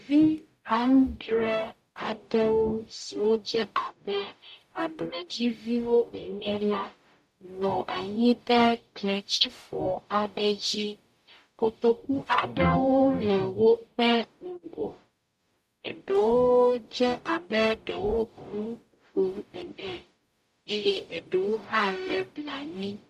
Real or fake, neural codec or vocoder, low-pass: fake; codec, 44.1 kHz, 0.9 kbps, DAC; 14.4 kHz